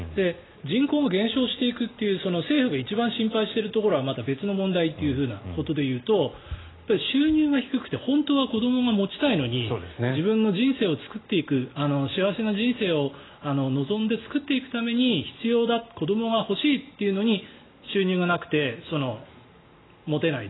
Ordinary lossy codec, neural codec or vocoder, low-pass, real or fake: AAC, 16 kbps; none; 7.2 kHz; real